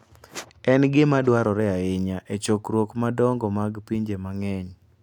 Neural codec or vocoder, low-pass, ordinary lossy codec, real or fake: none; 19.8 kHz; none; real